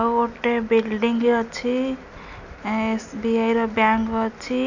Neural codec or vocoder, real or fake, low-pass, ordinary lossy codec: none; real; 7.2 kHz; none